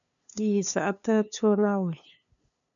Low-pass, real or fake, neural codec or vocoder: 7.2 kHz; fake; codec, 16 kHz, 2 kbps, FunCodec, trained on LibriTTS, 25 frames a second